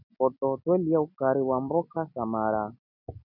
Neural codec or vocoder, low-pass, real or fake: none; 5.4 kHz; real